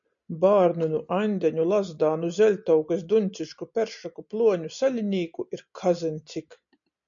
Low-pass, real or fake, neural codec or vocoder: 7.2 kHz; real; none